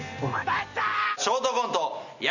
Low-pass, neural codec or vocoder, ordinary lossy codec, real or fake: 7.2 kHz; none; none; real